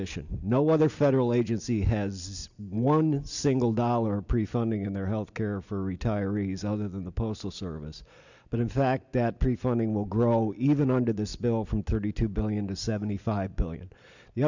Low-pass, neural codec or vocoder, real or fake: 7.2 kHz; none; real